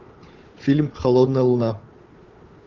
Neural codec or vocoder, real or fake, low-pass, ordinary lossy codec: codec, 16 kHz, 4 kbps, FunCodec, trained on Chinese and English, 50 frames a second; fake; 7.2 kHz; Opus, 32 kbps